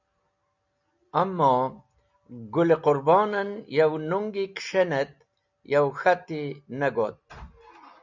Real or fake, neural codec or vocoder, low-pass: real; none; 7.2 kHz